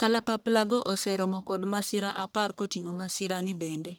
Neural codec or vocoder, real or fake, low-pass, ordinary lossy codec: codec, 44.1 kHz, 1.7 kbps, Pupu-Codec; fake; none; none